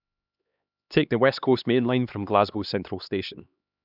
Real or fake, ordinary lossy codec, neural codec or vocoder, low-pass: fake; none; codec, 16 kHz, 2 kbps, X-Codec, HuBERT features, trained on LibriSpeech; 5.4 kHz